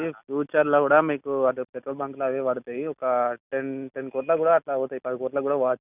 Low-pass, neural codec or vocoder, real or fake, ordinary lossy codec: 3.6 kHz; none; real; none